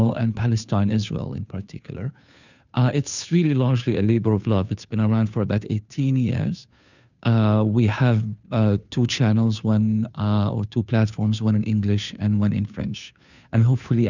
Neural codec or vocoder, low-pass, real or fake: codec, 16 kHz, 2 kbps, FunCodec, trained on Chinese and English, 25 frames a second; 7.2 kHz; fake